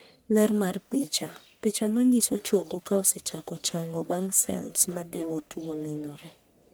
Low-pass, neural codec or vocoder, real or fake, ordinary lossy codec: none; codec, 44.1 kHz, 1.7 kbps, Pupu-Codec; fake; none